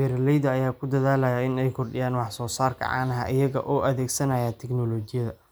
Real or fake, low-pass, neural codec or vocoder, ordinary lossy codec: real; none; none; none